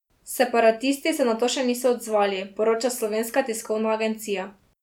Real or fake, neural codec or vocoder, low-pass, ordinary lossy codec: real; none; 19.8 kHz; none